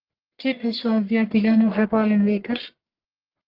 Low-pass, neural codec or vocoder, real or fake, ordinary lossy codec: 5.4 kHz; codec, 44.1 kHz, 1.7 kbps, Pupu-Codec; fake; Opus, 32 kbps